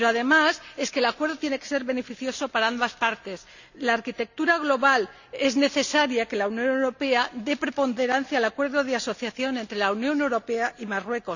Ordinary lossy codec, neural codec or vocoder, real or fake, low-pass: none; none; real; 7.2 kHz